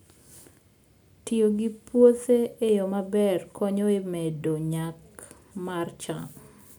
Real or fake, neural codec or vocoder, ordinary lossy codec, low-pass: real; none; none; none